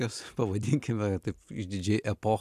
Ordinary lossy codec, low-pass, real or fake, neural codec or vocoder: AAC, 96 kbps; 14.4 kHz; real; none